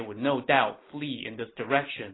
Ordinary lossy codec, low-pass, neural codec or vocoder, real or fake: AAC, 16 kbps; 7.2 kHz; none; real